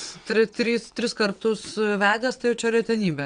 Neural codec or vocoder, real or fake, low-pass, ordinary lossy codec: vocoder, 22.05 kHz, 80 mel bands, WaveNeXt; fake; 9.9 kHz; Opus, 64 kbps